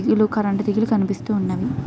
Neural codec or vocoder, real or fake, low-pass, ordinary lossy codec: none; real; none; none